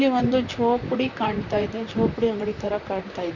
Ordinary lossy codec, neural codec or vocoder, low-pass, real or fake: none; vocoder, 44.1 kHz, 128 mel bands, Pupu-Vocoder; 7.2 kHz; fake